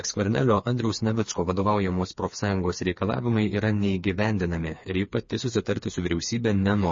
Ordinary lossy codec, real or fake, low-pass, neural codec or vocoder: MP3, 32 kbps; fake; 7.2 kHz; codec, 16 kHz, 4 kbps, FreqCodec, smaller model